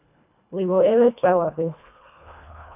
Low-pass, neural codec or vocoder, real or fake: 3.6 kHz; codec, 24 kHz, 1.5 kbps, HILCodec; fake